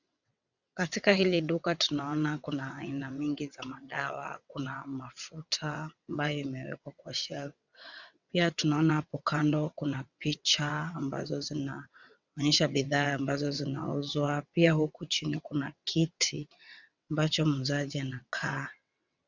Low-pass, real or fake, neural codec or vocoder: 7.2 kHz; fake; vocoder, 22.05 kHz, 80 mel bands, WaveNeXt